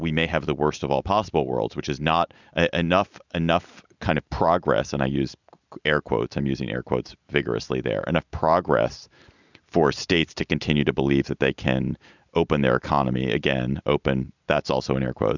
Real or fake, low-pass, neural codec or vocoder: real; 7.2 kHz; none